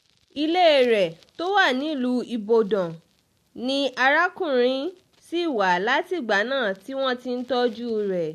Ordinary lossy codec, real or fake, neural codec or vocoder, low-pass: MP3, 64 kbps; real; none; 14.4 kHz